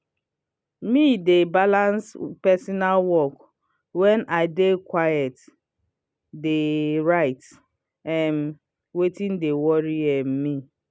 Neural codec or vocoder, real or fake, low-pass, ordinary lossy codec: none; real; none; none